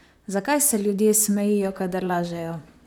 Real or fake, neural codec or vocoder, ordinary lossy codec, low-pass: fake; vocoder, 44.1 kHz, 128 mel bands, Pupu-Vocoder; none; none